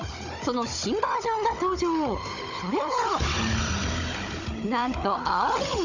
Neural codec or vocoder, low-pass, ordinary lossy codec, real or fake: codec, 16 kHz, 16 kbps, FunCodec, trained on Chinese and English, 50 frames a second; 7.2 kHz; Opus, 64 kbps; fake